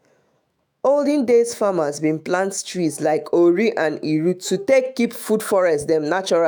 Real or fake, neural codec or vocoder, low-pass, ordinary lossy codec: fake; autoencoder, 48 kHz, 128 numbers a frame, DAC-VAE, trained on Japanese speech; none; none